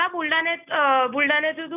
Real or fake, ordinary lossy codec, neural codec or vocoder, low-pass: real; none; none; 3.6 kHz